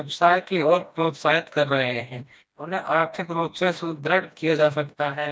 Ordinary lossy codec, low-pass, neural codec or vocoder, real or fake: none; none; codec, 16 kHz, 1 kbps, FreqCodec, smaller model; fake